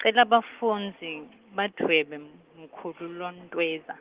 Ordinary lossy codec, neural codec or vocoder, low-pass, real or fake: Opus, 16 kbps; none; 3.6 kHz; real